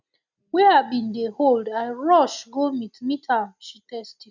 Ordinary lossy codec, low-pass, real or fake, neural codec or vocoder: none; 7.2 kHz; real; none